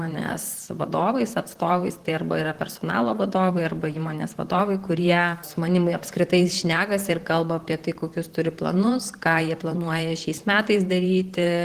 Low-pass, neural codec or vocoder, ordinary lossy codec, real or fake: 14.4 kHz; vocoder, 44.1 kHz, 128 mel bands, Pupu-Vocoder; Opus, 16 kbps; fake